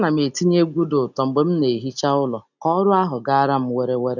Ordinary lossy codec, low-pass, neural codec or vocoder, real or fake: none; 7.2 kHz; none; real